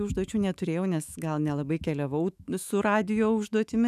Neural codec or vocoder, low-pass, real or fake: autoencoder, 48 kHz, 128 numbers a frame, DAC-VAE, trained on Japanese speech; 14.4 kHz; fake